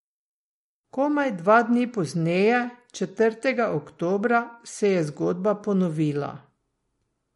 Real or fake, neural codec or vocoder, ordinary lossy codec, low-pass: real; none; MP3, 48 kbps; 19.8 kHz